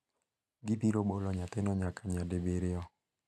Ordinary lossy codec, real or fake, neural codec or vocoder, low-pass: none; real; none; none